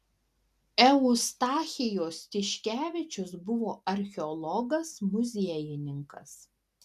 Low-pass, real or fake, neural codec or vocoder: 14.4 kHz; real; none